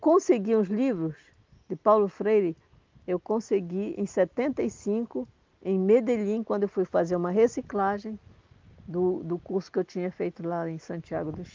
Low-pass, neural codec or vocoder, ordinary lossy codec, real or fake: 7.2 kHz; none; Opus, 32 kbps; real